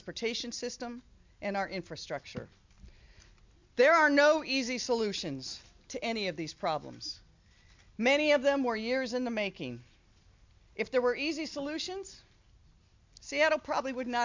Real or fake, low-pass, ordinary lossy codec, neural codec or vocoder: real; 7.2 kHz; MP3, 64 kbps; none